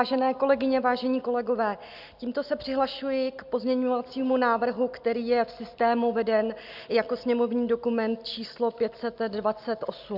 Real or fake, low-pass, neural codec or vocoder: real; 5.4 kHz; none